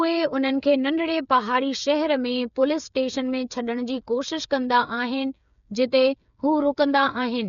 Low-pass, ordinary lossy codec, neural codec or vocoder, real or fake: 7.2 kHz; MP3, 96 kbps; codec, 16 kHz, 8 kbps, FreqCodec, smaller model; fake